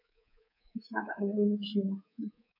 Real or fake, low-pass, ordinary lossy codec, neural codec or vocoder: fake; 5.4 kHz; none; codec, 24 kHz, 3.1 kbps, DualCodec